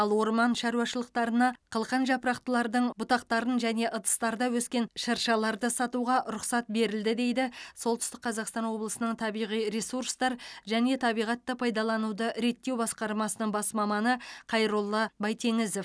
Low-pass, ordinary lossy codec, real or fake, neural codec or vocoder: none; none; real; none